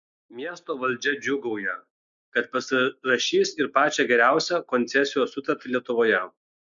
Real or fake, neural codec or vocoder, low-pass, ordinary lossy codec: real; none; 7.2 kHz; MP3, 64 kbps